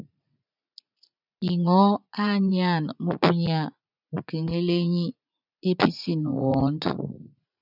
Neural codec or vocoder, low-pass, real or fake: vocoder, 44.1 kHz, 80 mel bands, Vocos; 5.4 kHz; fake